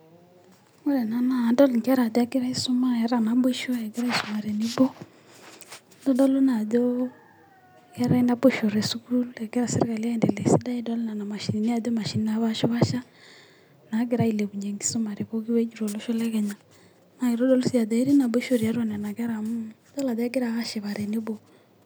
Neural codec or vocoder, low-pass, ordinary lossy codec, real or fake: none; none; none; real